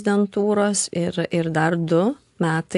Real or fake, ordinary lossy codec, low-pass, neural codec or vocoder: real; AAC, 64 kbps; 10.8 kHz; none